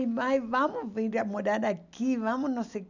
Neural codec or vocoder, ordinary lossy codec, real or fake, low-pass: none; none; real; 7.2 kHz